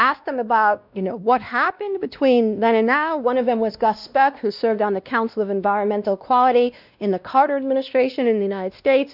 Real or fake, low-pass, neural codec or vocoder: fake; 5.4 kHz; codec, 16 kHz, 1 kbps, X-Codec, WavLM features, trained on Multilingual LibriSpeech